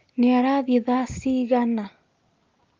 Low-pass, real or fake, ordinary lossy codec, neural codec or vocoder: 7.2 kHz; real; Opus, 32 kbps; none